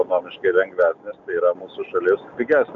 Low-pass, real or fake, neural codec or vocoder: 7.2 kHz; real; none